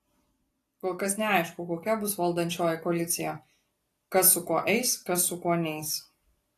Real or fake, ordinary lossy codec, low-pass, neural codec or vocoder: real; AAC, 48 kbps; 14.4 kHz; none